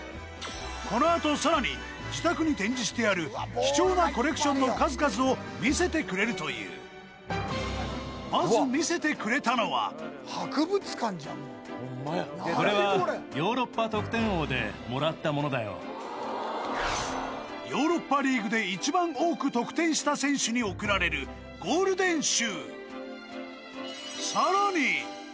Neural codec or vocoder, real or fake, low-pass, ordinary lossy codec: none; real; none; none